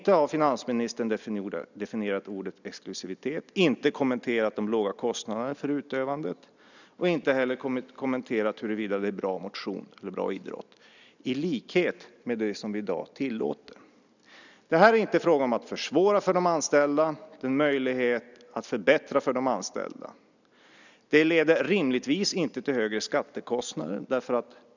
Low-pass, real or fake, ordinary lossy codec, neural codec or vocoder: 7.2 kHz; real; none; none